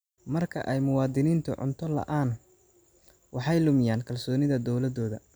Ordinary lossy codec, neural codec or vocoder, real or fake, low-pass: none; none; real; none